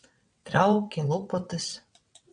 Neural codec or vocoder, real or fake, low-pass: vocoder, 22.05 kHz, 80 mel bands, WaveNeXt; fake; 9.9 kHz